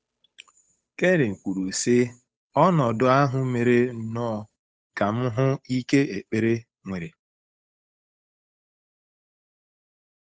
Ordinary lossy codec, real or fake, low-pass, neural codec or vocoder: none; fake; none; codec, 16 kHz, 8 kbps, FunCodec, trained on Chinese and English, 25 frames a second